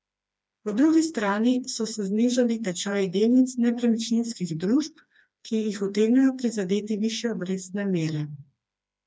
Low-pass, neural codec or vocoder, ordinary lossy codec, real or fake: none; codec, 16 kHz, 2 kbps, FreqCodec, smaller model; none; fake